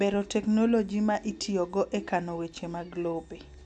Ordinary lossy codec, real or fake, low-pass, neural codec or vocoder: none; real; 10.8 kHz; none